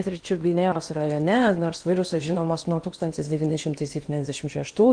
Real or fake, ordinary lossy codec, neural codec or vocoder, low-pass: fake; Opus, 24 kbps; codec, 16 kHz in and 24 kHz out, 0.8 kbps, FocalCodec, streaming, 65536 codes; 9.9 kHz